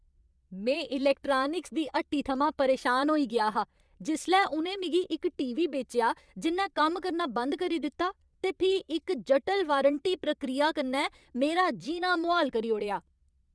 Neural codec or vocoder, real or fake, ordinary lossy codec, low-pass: vocoder, 22.05 kHz, 80 mel bands, Vocos; fake; none; none